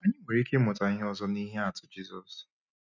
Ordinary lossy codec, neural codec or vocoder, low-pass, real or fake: none; none; 7.2 kHz; real